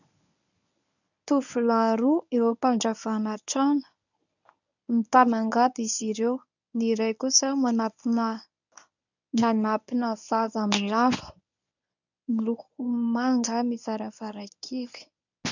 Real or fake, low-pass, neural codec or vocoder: fake; 7.2 kHz; codec, 24 kHz, 0.9 kbps, WavTokenizer, medium speech release version 1